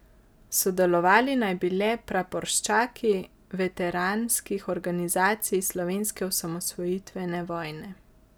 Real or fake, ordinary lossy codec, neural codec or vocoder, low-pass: real; none; none; none